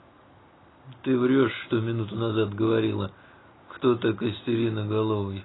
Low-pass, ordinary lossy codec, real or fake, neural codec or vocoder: 7.2 kHz; AAC, 16 kbps; real; none